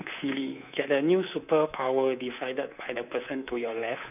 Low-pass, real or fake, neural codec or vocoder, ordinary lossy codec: 3.6 kHz; fake; codec, 24 kHz, 3.1 kbps, DualCodec; none